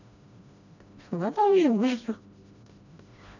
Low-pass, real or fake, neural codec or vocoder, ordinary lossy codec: 7.2 kHz; fake; codec, 16 kHz, 1 kbps, FreqCodec, smaller model; none